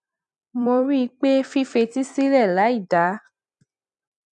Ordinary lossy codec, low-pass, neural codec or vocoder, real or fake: none; 10.8 kHz; vocoder, 44.1 kHz, 128 mel bands every 256 samples, BigVGAN v2; fake